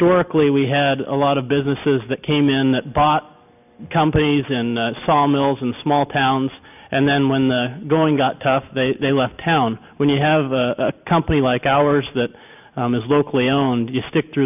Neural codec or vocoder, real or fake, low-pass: none; real; 3.6 kHz